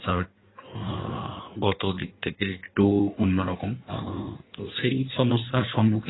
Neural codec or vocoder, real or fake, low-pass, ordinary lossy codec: codec, 16 kHz in and 24 kHz out, 1.1 kbps, FireRedTTS-2 codec; fake; 7.2 kHz; AAC, 16 kbps